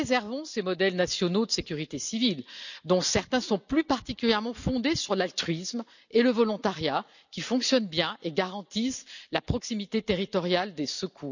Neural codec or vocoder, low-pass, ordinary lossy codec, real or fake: none; 7.2 kHz; none; real